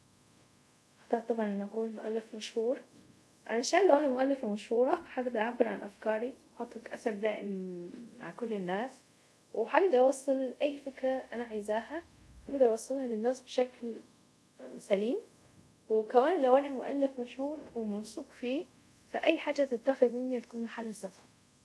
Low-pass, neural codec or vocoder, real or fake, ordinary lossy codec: none; codec, 24 kHz, 0.5 kbps, DualCodec; fake; none